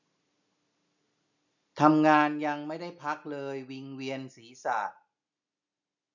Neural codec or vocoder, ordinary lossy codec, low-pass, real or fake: none; none; 7.2 kHz; real